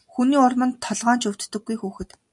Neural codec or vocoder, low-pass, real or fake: none; 10.8 kHz; real